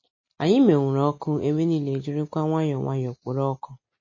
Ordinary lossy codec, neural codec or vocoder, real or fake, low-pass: MP3, 32 kbps; none; real; 7.2 kHz